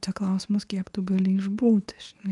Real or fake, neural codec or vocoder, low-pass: fake; codec, 24 kHz, 0.9 kbps, WavTokenizer, medium speech release version 2; 10.8 kHz